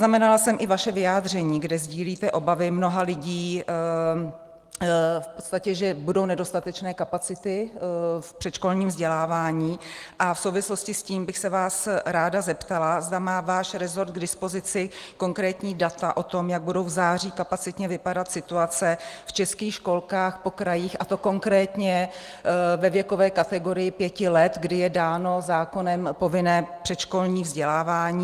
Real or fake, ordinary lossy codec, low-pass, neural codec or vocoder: real; Opus, 24 kbps; 14.4 kHz; none